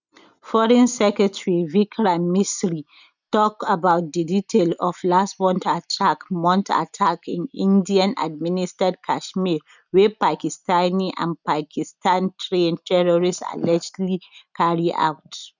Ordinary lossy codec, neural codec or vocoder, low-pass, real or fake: none; none; 7.2 kHz; real